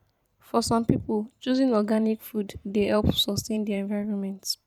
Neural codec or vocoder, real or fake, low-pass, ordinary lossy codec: vocoder, 44.1 kHz, 128 mel bands every 512 samples, BigVGAN v2; fake; 19.8 kHz; none